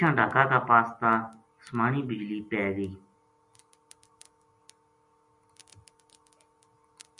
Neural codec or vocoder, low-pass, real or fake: none; 10.8 kHz; real